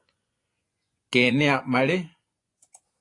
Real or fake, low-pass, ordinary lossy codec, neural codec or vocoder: fake; 10.8 kHz; AAC, 48 kbps; vocoder, 24 kHz, 100 mel bands, Vocos